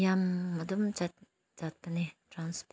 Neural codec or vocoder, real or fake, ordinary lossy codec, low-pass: none; real; none; none